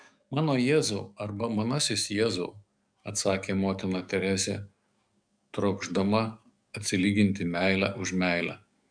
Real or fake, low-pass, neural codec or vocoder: fake; 9.9 kHz; codec, 44.1 kHz, 7.8 kbps, DAC